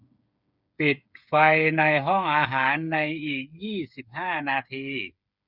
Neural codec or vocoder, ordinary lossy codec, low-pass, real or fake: codec, 16 kHz, 8 kbps, FreqCodec, smaller model; none; 5.4 kHz; fake